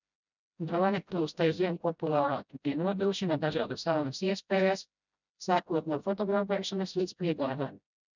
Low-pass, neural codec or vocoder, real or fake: 7.2 kHz; codec, 16 kHz, 0.5 kbps, FreqCodec, smaller model; fake